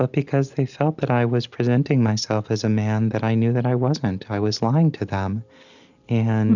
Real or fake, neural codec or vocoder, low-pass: real; none; 7.2 kHz